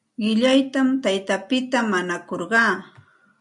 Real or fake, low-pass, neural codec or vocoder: real; 10.8 kHz; none